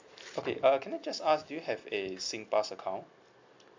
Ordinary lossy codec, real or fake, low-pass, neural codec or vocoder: MP3, 64 kbps; real; 7.2 kHz; none